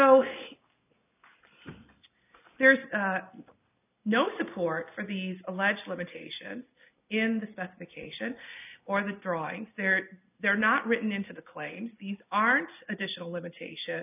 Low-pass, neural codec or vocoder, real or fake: 3.6 kHz; none; real